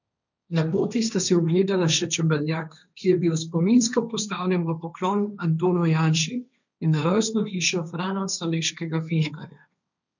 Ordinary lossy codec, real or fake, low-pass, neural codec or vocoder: none; fake; 7.2 kHz; codec, 16 kHz, 1.1 kbps, Voila-Tokenizer